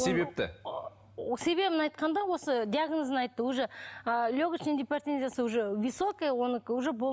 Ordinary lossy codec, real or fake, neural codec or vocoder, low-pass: none; real; none; none